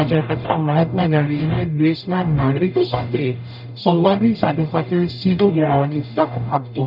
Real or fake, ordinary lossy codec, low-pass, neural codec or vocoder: fake; none; 5.4 kHz; codec, 44.1 kHz, 0.9 kbps, DAC